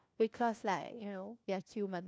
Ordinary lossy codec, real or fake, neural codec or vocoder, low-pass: none; fake; codec, 16 kHz, 1 kbps, FunCodec, trained on LibriTTS, 50 frames a second; none